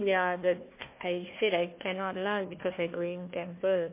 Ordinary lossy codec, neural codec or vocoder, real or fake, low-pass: MP3, 24 kbps; codec, 16 kHz, 1 kbps, FunCodec, trained on Chinese and English, 50 frames a second; fake; 3.6 kHz